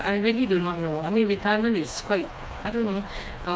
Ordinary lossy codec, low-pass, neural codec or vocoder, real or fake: none; none; codec, 16 kHz, 2 kbps, FreqCodec, smaller model; fake